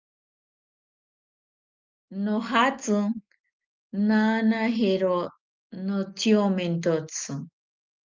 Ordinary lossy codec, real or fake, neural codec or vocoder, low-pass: Opus, 32 kbps; real; none; 7.2 kHz